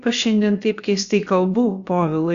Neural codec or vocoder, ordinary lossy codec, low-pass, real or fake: codec, 16 kHz, about 1 kbps, DyCAST, with the encoder's durations; Opus, 64 kbps; 7.2 kHz; fake